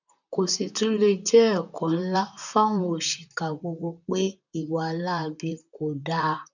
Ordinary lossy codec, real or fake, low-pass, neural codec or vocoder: none; fake; 7.2 kHz; vocoder, 44.1 kHz, 128 mel bands, Pupu-Vocoder